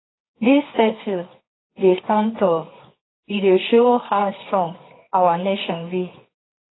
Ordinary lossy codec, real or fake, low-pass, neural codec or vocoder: AAC, 16 kbps; fake; 7.2 kHz; codec, 24 kHz, 0.9 kbps, WavTokenizer, medium music audio release